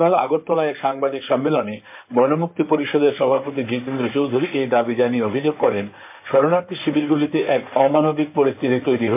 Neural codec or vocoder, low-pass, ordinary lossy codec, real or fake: codec, 16 kHz in and 24 kHz out, 2.2 kbps, FireRedTTS-2 codec; 3.6 kHz; MP3, 32 kbps; fake